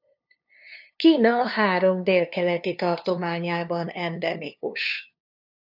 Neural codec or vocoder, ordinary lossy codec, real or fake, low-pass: codec, 16 kHz, 2 kbps, FunCodec, trained on LibriTTS, 25 frames a second; AAC, 48 kbps; fake; 5.4 kHz